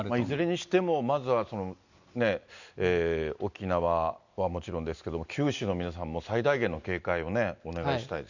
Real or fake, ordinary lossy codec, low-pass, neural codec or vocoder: real; none; 7.2 kHz; none